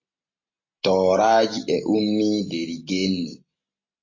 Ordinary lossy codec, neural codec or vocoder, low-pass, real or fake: MP3, 32 kbps; none; 7.2 kHz; real